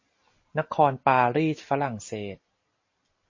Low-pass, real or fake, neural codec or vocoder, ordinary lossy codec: 7.2 kHz; real; none; MP3, 32 kbps